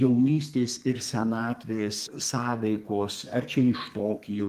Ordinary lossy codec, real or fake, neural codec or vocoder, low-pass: Opus, 32 kbps; fake; codec, 32 kHz, 1.9 kbps, SNAC; 14.4 kHz